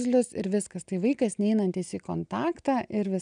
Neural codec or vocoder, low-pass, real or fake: none; 9.9 kHz; real